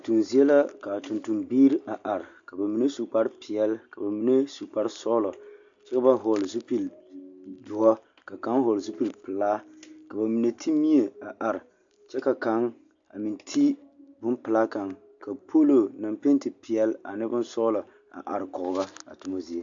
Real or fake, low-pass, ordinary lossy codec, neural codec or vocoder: real; 7.2 kHz; AAC, 64 kbps; none